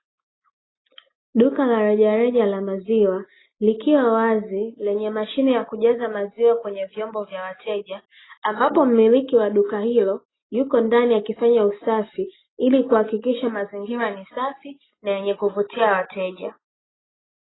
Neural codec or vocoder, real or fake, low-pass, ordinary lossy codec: none; real; 7.2 kHz; AAC, 16 kbps